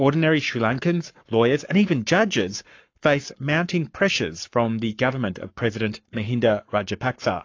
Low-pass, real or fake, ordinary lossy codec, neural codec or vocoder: 7.2 kHz; fake; AAC, 48 kbps; codec, 44.1 kHz, 7.8 kbps, Pupu-Codec